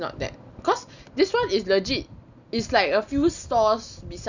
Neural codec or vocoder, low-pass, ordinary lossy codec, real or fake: none; 7.2 kHz; none; real